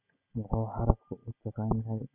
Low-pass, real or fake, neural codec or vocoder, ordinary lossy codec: 3.6 kHz; real; none; none